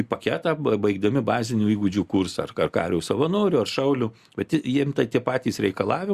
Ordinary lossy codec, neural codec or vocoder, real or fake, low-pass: Opus, 64 kbps; none; real; 14.4 kHz